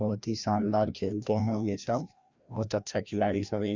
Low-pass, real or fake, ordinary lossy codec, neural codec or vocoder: 7.2 kHz; fake; Opus, 64 kbps; codec, 16 kHz, 1 kbps, FreqCodec, larger model